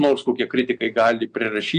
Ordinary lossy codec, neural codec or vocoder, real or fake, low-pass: AAC, 64 kbps; none; real; 9.9 kHz